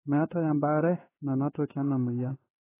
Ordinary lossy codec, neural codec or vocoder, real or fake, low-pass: AAC, 16 kbps; codec, 16 kHz in and 24 kHz out, 1 kbps, XY-Tokenizer; fake; 3.6 kHz